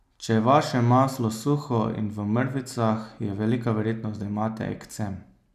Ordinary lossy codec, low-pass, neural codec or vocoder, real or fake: none; 14.4 kHz; none; real